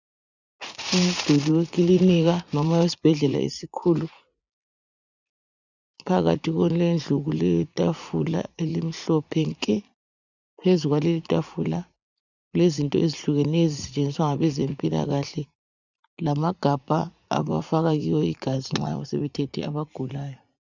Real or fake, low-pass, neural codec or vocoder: fake; 7.2 kHz; vocoder, 44.1 kHz, 80 mel bands, Vocos